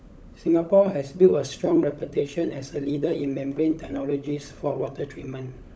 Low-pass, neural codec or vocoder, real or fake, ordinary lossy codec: none; codec, 16 kHz, 16 kbps, FunCodec, trained on LibriTTS, 50 frames a second; fake; none